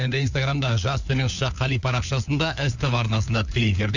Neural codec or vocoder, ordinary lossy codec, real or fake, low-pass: codec, 16 kHz, 4 kbps, FunCodec, trained on LibriTTS, 50 frames a second; none; fake; 7.2 kHz